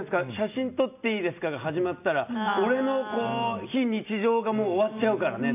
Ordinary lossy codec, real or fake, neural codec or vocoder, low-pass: none; real; none; 3.6 kHz